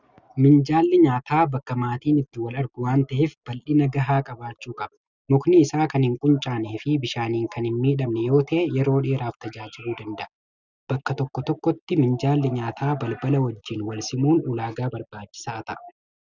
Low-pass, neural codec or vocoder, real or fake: 7.2 kHz; none; real